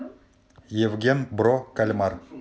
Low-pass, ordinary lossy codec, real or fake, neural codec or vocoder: none; none; real; none